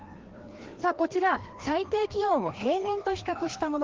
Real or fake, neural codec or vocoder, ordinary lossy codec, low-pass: fake; codec, 16 kHz, 2 kbps, FreqCodec, larger model; Opus, 16 kbps; 7.2 kHz